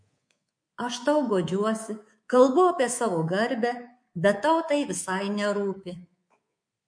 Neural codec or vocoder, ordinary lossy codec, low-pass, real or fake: codec, 24 kHz, 3.1 kbps, DualCodec; MP3, 48 kbps; 9.9 kHz; fake